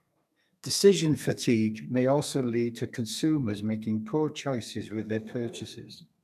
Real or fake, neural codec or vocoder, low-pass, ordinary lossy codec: fake; codec, 32 kHz, 1.9 kbps, SNAC; 14.4 kHz; none